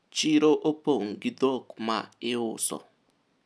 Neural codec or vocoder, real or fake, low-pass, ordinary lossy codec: none; real; none; none